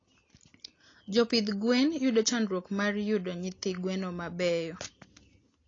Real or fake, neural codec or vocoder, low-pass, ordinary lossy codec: real; none; 7.2 kHz; AAC, 32 kbps